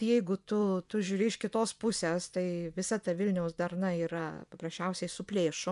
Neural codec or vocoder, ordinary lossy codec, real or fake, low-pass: vocoder, 24 kHz, 100 mel bands, Vocos; MP3, 96 kbps; fake; 10.8 kHz